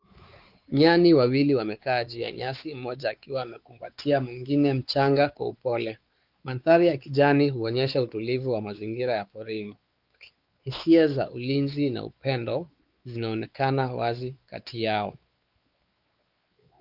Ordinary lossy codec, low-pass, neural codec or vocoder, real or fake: Opus, 32 kbps; 5.4 kHz; codec, 16 kHz, 4 kbps, X-Codec, WavLM features, trained on Multilingual LibriSpeech; fake